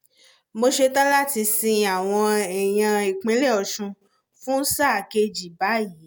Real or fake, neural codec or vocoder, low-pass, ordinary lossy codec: real; none; none; none